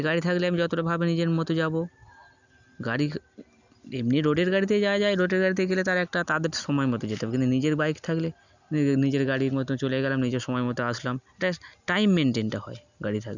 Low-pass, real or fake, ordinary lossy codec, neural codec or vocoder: 7.2 kHz; real; none; none